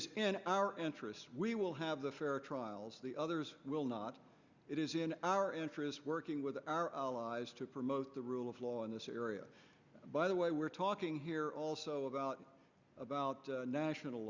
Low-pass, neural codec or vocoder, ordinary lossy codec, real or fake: 7.2 kHz; none; Opus, 64 kbps; real